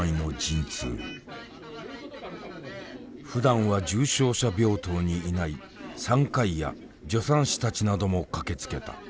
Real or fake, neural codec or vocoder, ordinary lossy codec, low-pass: real; none; none; none